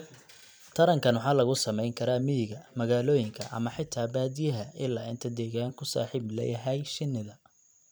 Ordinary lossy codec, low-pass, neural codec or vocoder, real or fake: none; none; vocoder, 44.1 kHz, 128 mel bands every 256 samples, BigVGAN v2; fake